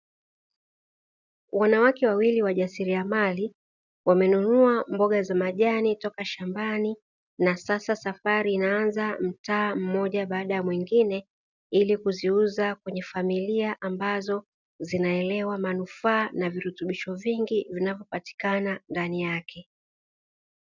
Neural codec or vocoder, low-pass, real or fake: none; 7.2 kHz; real